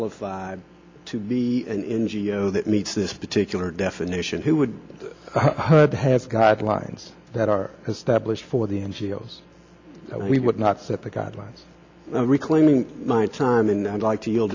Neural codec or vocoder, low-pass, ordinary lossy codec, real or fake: none; 7.2 kHz; MP3, 64 kbps; real